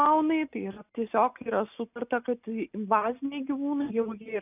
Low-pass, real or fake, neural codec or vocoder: 3.6 kHz; real; none